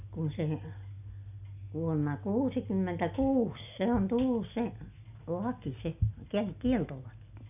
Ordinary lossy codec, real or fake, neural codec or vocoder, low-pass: none; real; none; 3.6 kHz